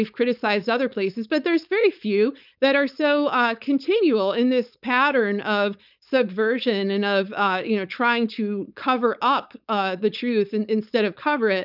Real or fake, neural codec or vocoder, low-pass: fake; codec, 16 kHz, 4.8 kbps, FACodec; 5.4 kHz